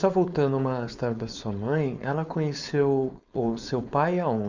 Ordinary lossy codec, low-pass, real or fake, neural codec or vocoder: Opus, 64 kbps; 7.2 kHz; fake; codec, 16 kHz, 4.8 kbps, FACodec